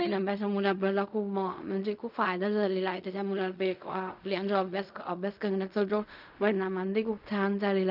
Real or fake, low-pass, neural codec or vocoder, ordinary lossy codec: fake; 5.4 kHz; codec, 16 kHz in and 24 kHz out, 0.4 kbps, LongCat-Audio-Codec, fine tuned four codebook decoder; none